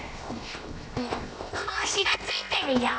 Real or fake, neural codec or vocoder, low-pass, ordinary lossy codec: fake; codec, 16 kHz, 0.7 kbps, FocalCodec; none; none